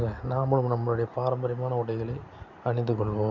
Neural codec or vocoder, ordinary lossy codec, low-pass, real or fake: none; none; 7.2 kHz; real